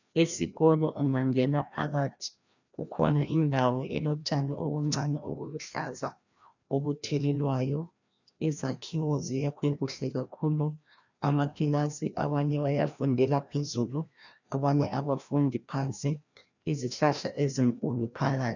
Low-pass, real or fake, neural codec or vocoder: 7.2 kHz; fake; codec, 16 kHz, 1 kbps, FreqCodec, larger model